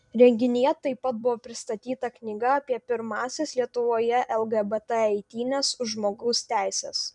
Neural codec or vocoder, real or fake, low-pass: vocoder, 44.1 kHz, 128 mel bands every 256 samples, BigVGAN v2; fake; 10.8 kHz